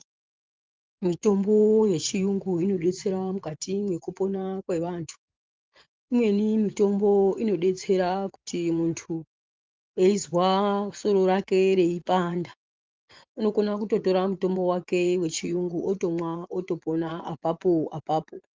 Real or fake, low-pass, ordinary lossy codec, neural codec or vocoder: fake; 7.2 kHz; Opus, 32 kbps; vocoder, 24 kHz, 100 mel bands, Vocos